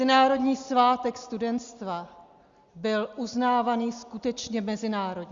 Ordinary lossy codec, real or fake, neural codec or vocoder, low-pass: Opus, 64 kbps; real; none; 7.2 kHz